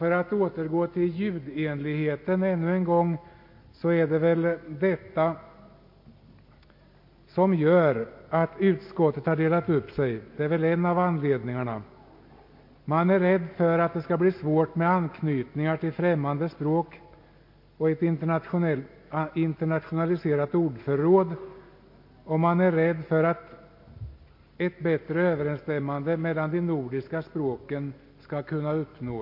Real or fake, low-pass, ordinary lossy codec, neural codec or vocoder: real; 5.4 kHz; MP3, 32 kbps; none